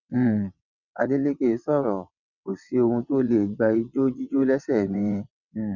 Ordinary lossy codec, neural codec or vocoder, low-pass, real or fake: none; vocoder, 22.05 kHz, 80 mel bands, WaveNeXt; 7.2 kHz; fake